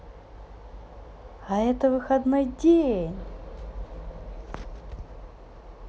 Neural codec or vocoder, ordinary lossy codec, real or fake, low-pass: none; none; real; none